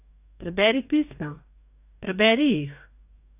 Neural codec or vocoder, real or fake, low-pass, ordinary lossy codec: codec, 44.1 kHz, 2.6 kbps, DAC; fake; 3.6 kHz; AAC, 32 kbps